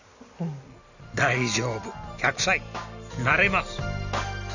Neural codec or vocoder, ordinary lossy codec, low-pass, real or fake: none; Opus, 64 kbps; 7.2 kHz; real